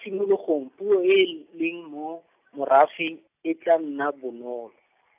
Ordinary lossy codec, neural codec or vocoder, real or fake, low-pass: AAC, 32 kbps; none; real; 3.6 kHz